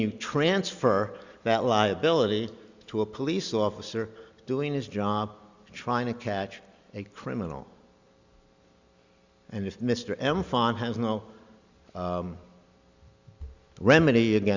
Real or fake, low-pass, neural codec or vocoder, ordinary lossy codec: real; 7.2 kHz; none; Opus, 64 kbps